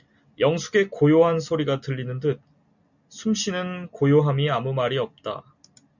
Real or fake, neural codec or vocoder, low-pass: real; none; 7.2 kHz